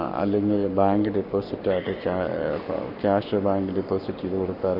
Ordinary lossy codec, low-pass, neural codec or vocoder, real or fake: none; 5.4 kHz; codec, 44.1 kHz, 7.8 kbps, Pupu-Codec; fake